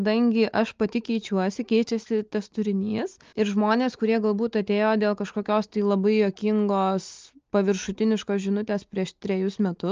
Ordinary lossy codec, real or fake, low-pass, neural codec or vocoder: Opus, 24 kbps; real; 7.2 kHz; none